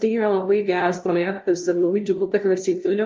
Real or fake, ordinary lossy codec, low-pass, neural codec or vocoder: fake; Opus, 24 kbps; 7.2 kHz; codec, 16 kHz, 0.5 kbps, FunCodec, trained on LibriTTS, 25 frames a second